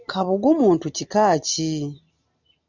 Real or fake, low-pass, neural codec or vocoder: real; 7.2 kHz; none